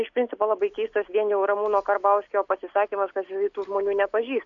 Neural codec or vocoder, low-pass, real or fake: none; 7.2 kHz; real